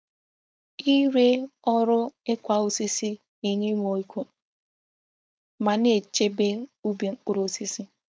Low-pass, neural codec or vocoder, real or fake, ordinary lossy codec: none; codec, 16 kHz, 4.8 kbps, FACodec; fake; none